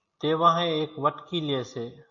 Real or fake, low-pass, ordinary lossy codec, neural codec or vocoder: real; 7.2 kHz; MP3, 32 kbps; none